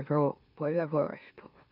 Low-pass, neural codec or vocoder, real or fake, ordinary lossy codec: 5.4 kHz; autoencoder, 44.1 kHz, a latent of 192 numbers a frame, MeloTTS; fake; none